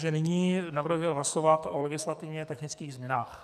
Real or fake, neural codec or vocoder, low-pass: fake; codec, 44.1 kHz, 2.6 kbps, SNAC; 14.4 kHz